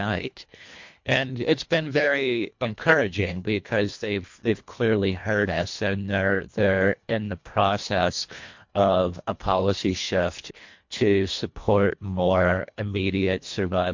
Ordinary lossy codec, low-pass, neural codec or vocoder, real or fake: MP3, 48 kbps; 7.2 kHz; codec, 24 kHz, 1.5 kbps, HILCodec; fake